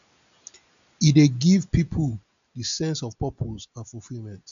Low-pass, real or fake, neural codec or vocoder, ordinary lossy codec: 7.2 kHz; real; none; none